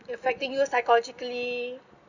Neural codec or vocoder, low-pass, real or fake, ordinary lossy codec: none; 7.2 kHz; real; none